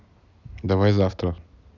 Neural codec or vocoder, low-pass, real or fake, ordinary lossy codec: none; 7.2 kHz; real; none